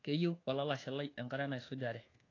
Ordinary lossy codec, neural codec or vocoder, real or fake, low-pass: AAC, 32 kbps; codec, 24 kHz, 1.2 kbps, DualCodec; fake; 7.2 kHz